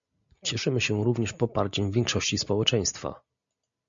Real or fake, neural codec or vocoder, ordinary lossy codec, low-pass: real; none; MP3, 64 kbps; 7.2 kHz